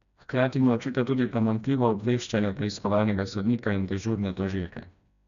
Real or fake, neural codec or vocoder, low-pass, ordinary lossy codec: fake; codec, 16 kHz, 1 kbps, FreqCodec, smaller model; 7.2 kHz; none